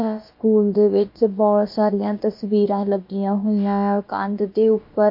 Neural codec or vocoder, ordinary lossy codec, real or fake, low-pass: codec, 16 kHz, about 1 kbps, DyCAST, with the encoder's durations; MP3, 32 kbps; fake; 5.4 kHz